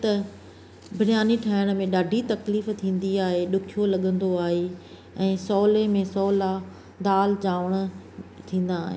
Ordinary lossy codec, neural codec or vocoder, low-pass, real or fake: none; none; none; real